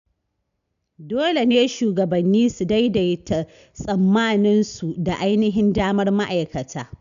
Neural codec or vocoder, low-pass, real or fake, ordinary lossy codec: none; 7.2 kHz; real; none